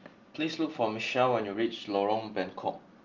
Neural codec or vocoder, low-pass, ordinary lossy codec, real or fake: none; 7.2 kHz; Opus, 32 kbps; real